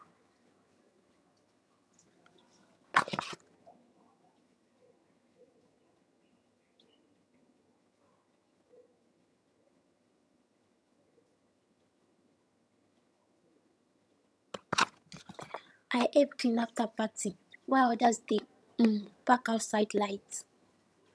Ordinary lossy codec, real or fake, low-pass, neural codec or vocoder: none; fake; none; vocoder, 22.05 kHz, 80 mel bands, HiFi-GAN